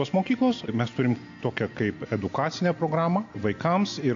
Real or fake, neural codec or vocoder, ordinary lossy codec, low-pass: real; none; AAC, 48 kbps; 7.2 kHz